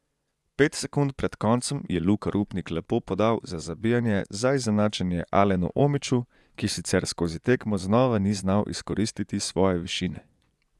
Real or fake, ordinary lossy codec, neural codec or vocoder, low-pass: fake; none; vocoder, 24 kHz, 100 mel bands, Vocos; none